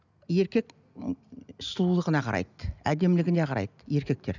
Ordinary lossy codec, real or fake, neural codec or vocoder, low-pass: none; real; none; 7.2 kHz